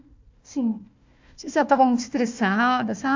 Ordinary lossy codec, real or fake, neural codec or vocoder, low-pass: MP3, 64 kbps; fake; codec, 16 kHz, 1 kbps, FunCodec, trained on Chinese and English, 50 frames a second; 7.2 kHz